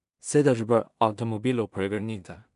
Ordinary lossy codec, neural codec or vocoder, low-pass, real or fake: none; codec, 16 kHz in and 24 kHz out, 0.4 kbps, LongCat-Audio-Codec, two codebook decoder; 10.8 kHz; fake